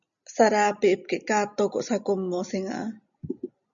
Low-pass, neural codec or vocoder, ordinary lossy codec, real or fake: 7.2 kHz; none; MP3, 96 kbps; real